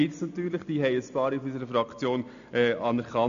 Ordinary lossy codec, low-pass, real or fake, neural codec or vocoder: none; 7.2 kHz; real; none